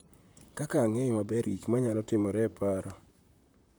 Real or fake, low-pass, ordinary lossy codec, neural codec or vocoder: fake; none; none; vocoder, 44.1 kHz, 128 mel bands, Pupu-Vocoder